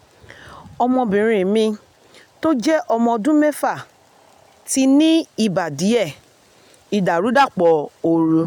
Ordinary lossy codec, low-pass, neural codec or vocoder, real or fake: none; 19.8 kHz; none; real